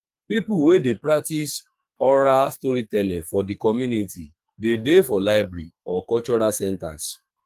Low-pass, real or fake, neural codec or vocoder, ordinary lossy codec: 14.4 kHz; fake; codec, 44.1 kHz, 2.6 kbps, SNAC; Opus, 32 kbps